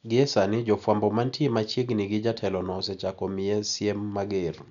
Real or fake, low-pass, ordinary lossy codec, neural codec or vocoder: real; 7.2 kHz; none; none